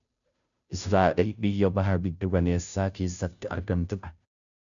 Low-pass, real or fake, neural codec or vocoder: 7.2 kHz; fake; codec, 16 kHz, 0.5 kbps, FunCodec, trained on Chinese and English, 25 frames a second